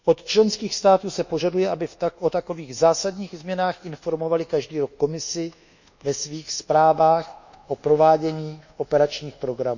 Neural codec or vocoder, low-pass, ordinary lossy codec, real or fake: codec, 24 kHz, 1.2 kbps, DualCodec; 7.2 kHz; none; fake